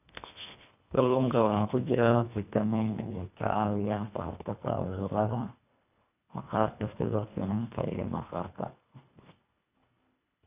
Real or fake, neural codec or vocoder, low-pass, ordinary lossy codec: fake; codec, 24 kHz, 1.5 kbps, HILCodec; 3.6 kHz; none